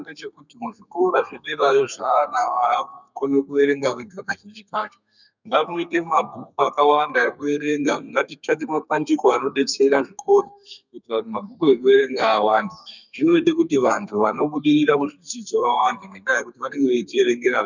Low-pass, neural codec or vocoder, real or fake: 7.2 kHz; codec, 32 kHz, 1.9 kbps, SNAC; fake